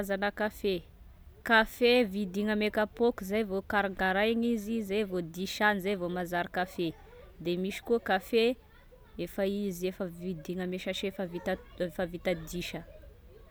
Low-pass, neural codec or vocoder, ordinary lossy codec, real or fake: none; none; none; real